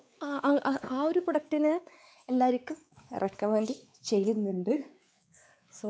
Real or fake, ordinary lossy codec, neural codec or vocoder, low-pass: fake; none; codec, 16 kHz, 4 kbps, X-Codec, WavLM features, trained on Multilingual LibriSpeech; none